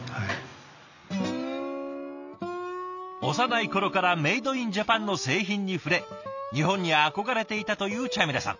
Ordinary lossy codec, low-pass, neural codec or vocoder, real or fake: none; 7.2 kHz; none; real